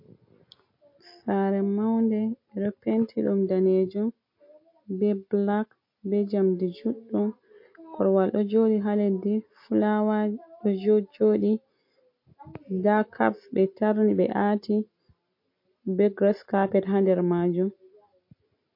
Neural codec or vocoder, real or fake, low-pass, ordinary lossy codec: none; real; 5.4 kHz; MP3, 32 kbps